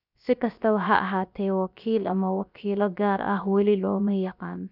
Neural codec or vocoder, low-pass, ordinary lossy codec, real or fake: codec, 16 kHz, about 1 kbps, DyCAST, with the encoder's durations; 5.4 kHz; none; fake